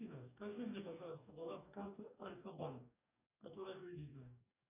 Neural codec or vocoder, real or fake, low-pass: codec, 44.1 kHz, 2.6 kbps, DAC; fake; 3.6 kHz